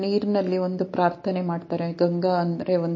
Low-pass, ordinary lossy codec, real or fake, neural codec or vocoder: 7.2 kHz; MP3, 32 kbps; real; none